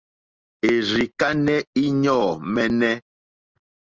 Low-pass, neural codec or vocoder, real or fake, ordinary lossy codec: 7.2 kHz; none; real; Opus, 24 kbps